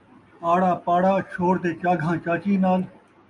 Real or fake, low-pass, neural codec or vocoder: real; 10.8 kHz; none